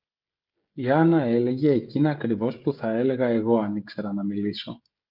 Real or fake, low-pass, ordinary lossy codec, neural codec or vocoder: fake; 5.4 kHz; Opus, 24 kbps; codec, 16 kHz, 16 kbps, FreqCodec, smaller model